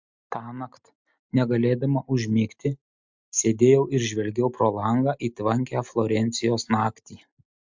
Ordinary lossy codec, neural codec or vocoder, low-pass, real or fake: MP3, 64 kbps; none; 7.2 kHz; real